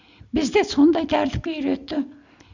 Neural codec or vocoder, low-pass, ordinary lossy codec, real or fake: none; 7.2 kHz; none; real